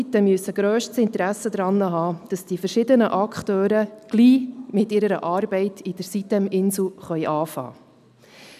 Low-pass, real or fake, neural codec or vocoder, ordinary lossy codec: 14.4 kHz; real; none; none